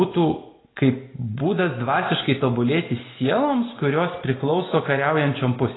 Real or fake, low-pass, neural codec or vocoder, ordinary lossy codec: real; 7.2 kHz; none; AAC, 16 kbps